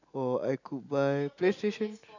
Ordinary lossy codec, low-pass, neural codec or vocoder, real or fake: none; 7.2 kHz; none; real